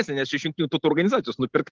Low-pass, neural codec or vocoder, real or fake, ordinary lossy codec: 7.2 kHz; none; real; Opus, 16 kbps